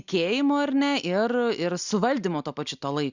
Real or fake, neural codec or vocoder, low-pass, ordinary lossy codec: real; none; 7.2 kHz; Opus, 64 kbps